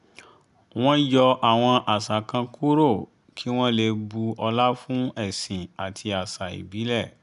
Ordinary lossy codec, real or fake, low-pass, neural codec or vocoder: none; real; 10.8 kHz; none